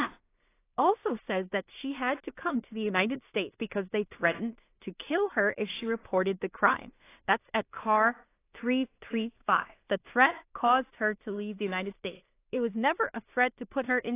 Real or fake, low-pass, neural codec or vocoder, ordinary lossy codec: fake; 3.6 kHz; codec, 16 kHz in and 24 kHz out, 0.4 kbps, LongCat-Audio-Codec, two codebook decoder; AAC, 24 kbps